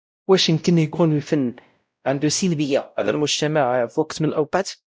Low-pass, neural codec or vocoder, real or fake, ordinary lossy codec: none; codec, 16 kHz, 0.5 kbps, X-Codec, WavLM features, trained on Multilingual LibriSpeech; fake; none